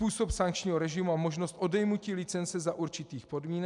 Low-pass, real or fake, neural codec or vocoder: 10.8 kHz; real; none